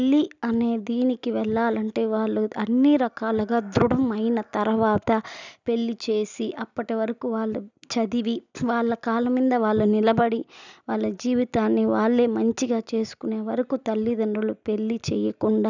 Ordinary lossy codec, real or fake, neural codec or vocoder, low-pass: none; real; none; 7.2 kHz